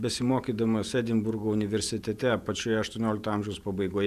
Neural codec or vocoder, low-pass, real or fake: vocoder, 48 kHz, 128 mel bands, Vocos; 14.4 kHz; fake